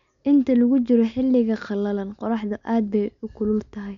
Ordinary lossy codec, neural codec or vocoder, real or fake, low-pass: none; none; real; 7.2 kHz